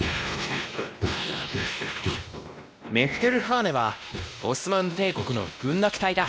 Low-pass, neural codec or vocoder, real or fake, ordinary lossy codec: none; codec, 16 kHz, 1 kbps, X-Codec, WavLM features, trained on Multilingual LibriSpeech; fake; none